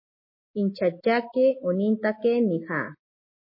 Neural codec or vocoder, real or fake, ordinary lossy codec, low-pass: none; real; MP3, 24 kbps; 5.4 kHz